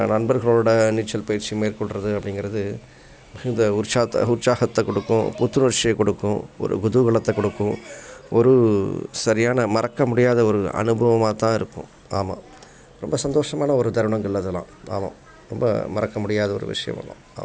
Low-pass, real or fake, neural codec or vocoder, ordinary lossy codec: none; real; none; none